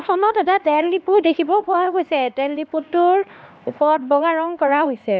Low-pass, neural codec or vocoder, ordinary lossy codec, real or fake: none; codec, 16 kHz, 2 kbps, X-Codec, HuBERT features, trained on LibriSpeech; none; fake